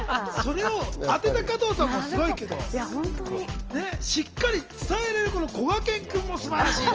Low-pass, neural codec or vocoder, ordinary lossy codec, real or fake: 7.2 kHz; none; Opus, 24 kbps; real